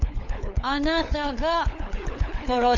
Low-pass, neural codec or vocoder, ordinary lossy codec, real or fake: 7.2 kHz; codec, 16 kHz, 4 kbps, FunCodec, trained on LibriTTS, 50 frames a second; none; fake